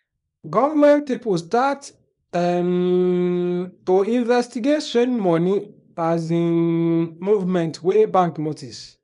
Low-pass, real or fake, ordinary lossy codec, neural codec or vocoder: 10.8 kHz; fake; none; codec, 24 kHz, 0.9 kbps, WavTokenizer, small release